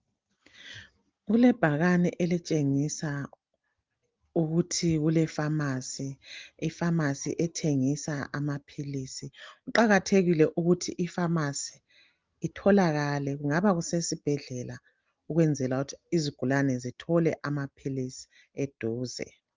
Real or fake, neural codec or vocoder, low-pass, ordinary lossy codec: real; none; 7.2 kHz; Opus, 32 kbps